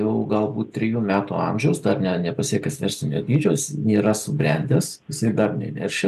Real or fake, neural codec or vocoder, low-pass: real; none; 14.4 kHz